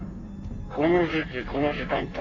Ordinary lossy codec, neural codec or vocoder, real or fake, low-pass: none; codec, 16 kHz in and 24 kHz out, 1.1 kbps, FireRedTTS-2 codec; fake; 7.2 kHz